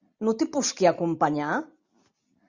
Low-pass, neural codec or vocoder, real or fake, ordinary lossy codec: 7.2 kHz; none; real; Opus, 64 kbps